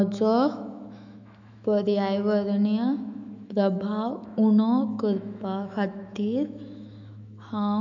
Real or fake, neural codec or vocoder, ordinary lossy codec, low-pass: fake; autoencoder, 48 kHz, 128 numbers a frame, DAC-VAE, trained on Japanese speech; none; 7.2 kHz